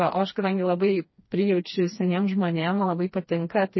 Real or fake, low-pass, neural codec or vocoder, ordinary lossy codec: fake; 7.2 kHz; codec, 16 kHz, 2 kbps, FreqCodec, smaller model; MP3, 24 kbps